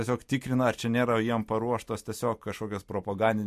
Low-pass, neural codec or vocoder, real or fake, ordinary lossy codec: 14.4 kHz; none; real; MP3, 64 kbps